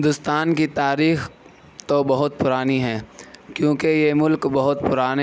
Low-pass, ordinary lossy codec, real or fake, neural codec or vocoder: none; none; real; none